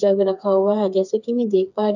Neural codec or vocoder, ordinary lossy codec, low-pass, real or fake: codec, 16 kHz, 4 kbps, FreqCodec, smaller model; none; 7.2 kHz; fake